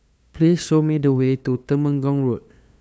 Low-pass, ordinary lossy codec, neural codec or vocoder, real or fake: none; none; codec, 16 kHz, 2 kbps, FunCodec, trained on LibriTTS, 25 frames a second; fake